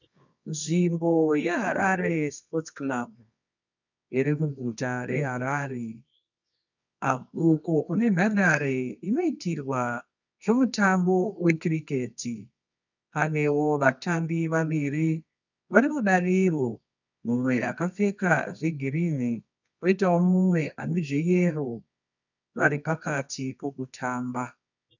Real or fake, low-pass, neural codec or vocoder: fake; 7.2 kHz; codec, 24 kHz, 0.9 kbps, WavTokenizer, medium music audio release